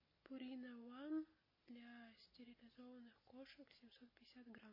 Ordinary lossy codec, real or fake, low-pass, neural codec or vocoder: MP3, 24 kbps; real; 5.4 kHz; none